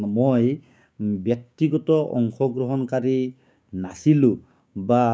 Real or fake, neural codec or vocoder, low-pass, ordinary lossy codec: fake; codec, 16 kHz, 6 kbps, DAC; none; none